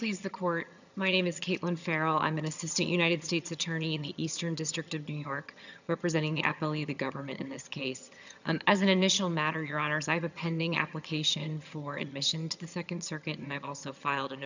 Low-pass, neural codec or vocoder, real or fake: 7.2 kHz; vocoder, 22.05 kHz, 80 mel bands, HiFi-GAN; fake